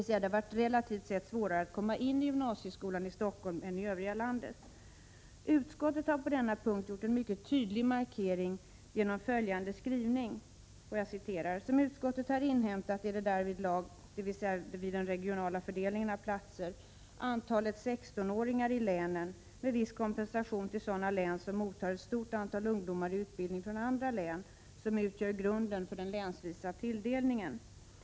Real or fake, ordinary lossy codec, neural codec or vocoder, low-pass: real; none; none; none